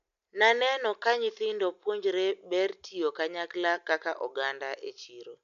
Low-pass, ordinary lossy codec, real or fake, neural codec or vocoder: 7.2 kHz; none; real; none